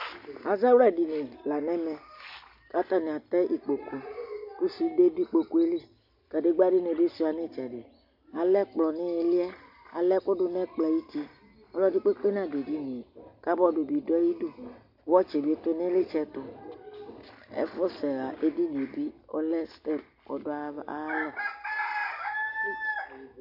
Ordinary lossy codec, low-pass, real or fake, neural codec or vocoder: MP3, 48 kbps; 5.4 kHz; real; none